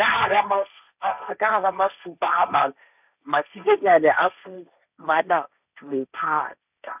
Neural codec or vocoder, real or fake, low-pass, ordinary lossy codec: codec, 16 kHz, 1.1 kbps, Voila-Tokenizer; fake; 3.6 kHz; none